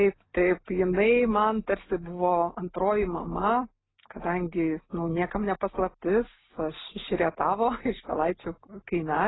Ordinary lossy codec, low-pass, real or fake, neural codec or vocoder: AAC, 16 kbps; 7.2 kHz; real; none